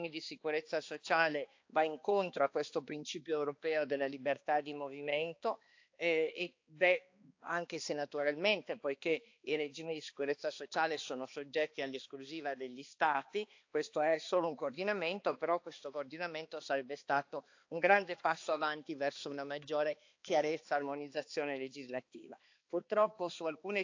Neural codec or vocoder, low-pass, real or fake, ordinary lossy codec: codec, 16 kHz, 2 kbps, X-Codec, HuBERT features, trained on balanced general audio; 7.2 kHz; fake; AAC, 48 kbps